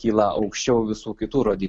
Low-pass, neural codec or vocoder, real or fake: 9.9 kHz; none; real